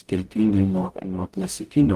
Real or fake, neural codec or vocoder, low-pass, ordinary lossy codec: fake; codec, 44.1 kHz, 0.9 kbps, DAC; 14.4 kHz; Opus, 24 kbps